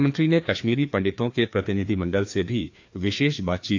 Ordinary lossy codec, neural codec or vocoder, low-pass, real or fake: AAC, 48 kbps; codec, 16 kHz, 2 kbps, FreqCodec, larger model; 7.2 kHz; fake